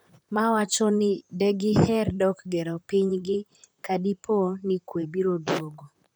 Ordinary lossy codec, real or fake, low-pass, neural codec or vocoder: none; fake; none; vocoder, 44.1 kHz, 128 mel bands, Pupu-Vocoder